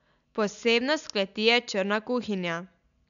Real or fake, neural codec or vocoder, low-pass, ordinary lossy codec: real; none; 7.2 kHz; none